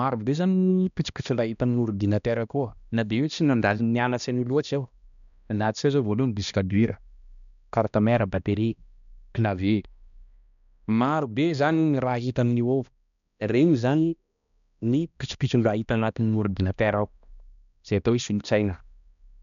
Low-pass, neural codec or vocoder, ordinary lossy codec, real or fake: 7.2 kHz; codec, 16 kHz, 1 kbps, X-Codec, HuBERT features, trained on balanced general audio; none; fake